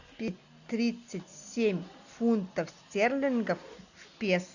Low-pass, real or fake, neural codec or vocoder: 7.2 kHz; real; none